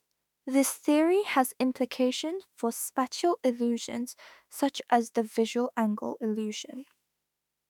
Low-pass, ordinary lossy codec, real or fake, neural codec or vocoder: 19.8 kHz; none; fake; autoencoder, 48 kHz, 32 numbers a frame, DAC-VAE, trained on Japanese speech